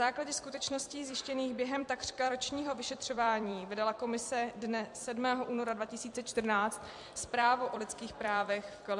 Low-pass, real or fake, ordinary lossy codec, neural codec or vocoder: 10.8 kHz; fake; MP3, 64 kbps; vocoder, 44.1 kHz, 128 mel bands every 256 samples, BigVGAN v2